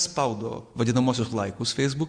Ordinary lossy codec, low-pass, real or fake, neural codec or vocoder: Opus, 64 kbps; 9.9 kHz; real; none